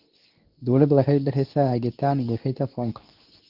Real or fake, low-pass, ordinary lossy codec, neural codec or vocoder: fake; 5.4 kHz; Opus, 32 kbps; codec, 24 kHz, 0.9 kbps, WavTokenizer, medium speech release version 2